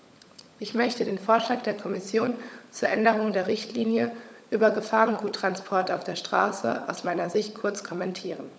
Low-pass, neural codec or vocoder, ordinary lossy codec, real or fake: none; codec, 16 kHz, 16 kbps, FunCodec, trained on LibriTTS, 50 frames a second; none; fake